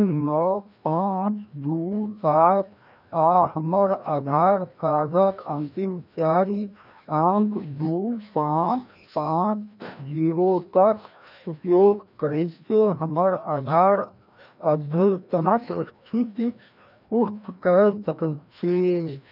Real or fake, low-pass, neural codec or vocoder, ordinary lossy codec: fake; 5.4 kHz; codec, 16 kHz, 1 kbps, FreqCodec, larger model; none